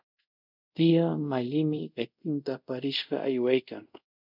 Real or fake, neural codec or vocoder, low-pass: fake; codec, 24 kHz, 0.5 kbps, DualCodec; 5.4 kHz